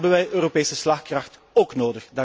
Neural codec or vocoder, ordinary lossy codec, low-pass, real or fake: none; none; none; real